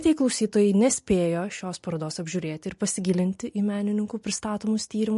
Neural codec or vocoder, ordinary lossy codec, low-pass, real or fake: none; MP3, 48 kbps; 14.4 kHz; real